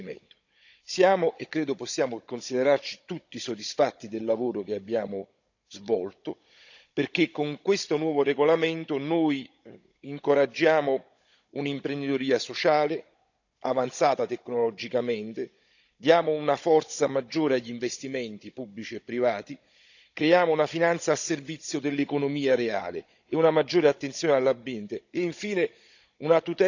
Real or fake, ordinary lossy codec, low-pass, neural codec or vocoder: fake; none; 7.2 kHz; codec, 16 kHz, 16 kbps, FunCodec, trained on Chinese and English, 50 frames a second